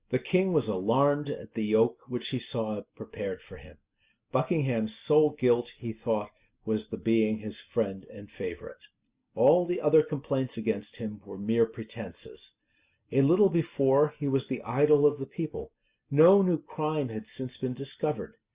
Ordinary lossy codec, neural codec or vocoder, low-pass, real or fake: Opus, 64 kbps; none; 3.6 kHz; real